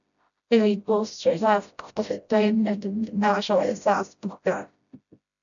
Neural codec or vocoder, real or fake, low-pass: codec, 16 kHz, 0.5 kbps, FreqCodec, smaller model; fake; 7.2 kHz